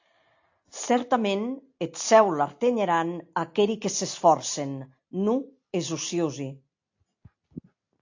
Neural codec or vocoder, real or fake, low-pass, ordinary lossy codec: none; real; 7.2 kHz; AAC, 48 kbps